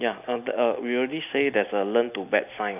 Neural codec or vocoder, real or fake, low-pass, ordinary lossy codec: none; real; 3.6 kHz; none